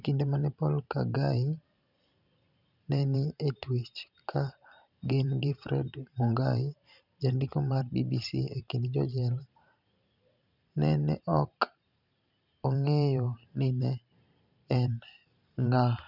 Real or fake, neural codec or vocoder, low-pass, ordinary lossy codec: fake; vocoder, 44.1 kHz, 128 mel bands every 512 samples, BigVGAN v2; 5.4 kHz; none